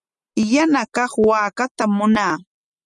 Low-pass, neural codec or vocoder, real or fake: 10.8 kHz; none; real